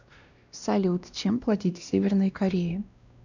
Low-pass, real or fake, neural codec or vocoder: 7.2 kHz; fake; codec, 16 kHz, 1 kbps, X-Codec, WavLM features, trained on Multilingual LibriSpeech